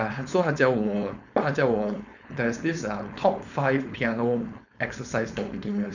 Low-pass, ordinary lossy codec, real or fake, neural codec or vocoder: 7.2 kHz; none; fake; codec, 16 kHz, 4.8 kbps, FACodec